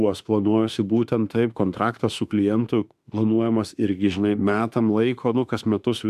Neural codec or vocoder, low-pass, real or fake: autoencoder, 48 kHz, 32 numbers a frame, DAC-VAE, trained on Japanese speech; 14.4 kHz; fake